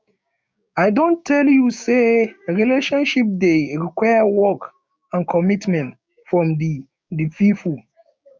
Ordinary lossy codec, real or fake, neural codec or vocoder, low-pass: none; fake; codec, 16 kHz, 6 kbps, DAC; none